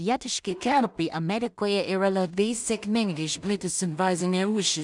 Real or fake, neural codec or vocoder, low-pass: fake; codec, 16 kHz in and 24 kHz out, 0.4 kbps, LongCat-Audio-Codec, two codebook decoder; 10.8 kHz